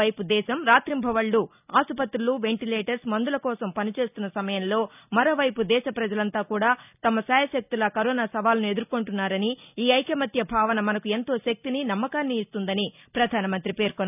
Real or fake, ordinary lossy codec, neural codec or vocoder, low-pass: real; none; none; 3.6 kHz